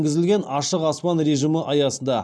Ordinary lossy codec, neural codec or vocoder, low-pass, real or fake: none; none; none; real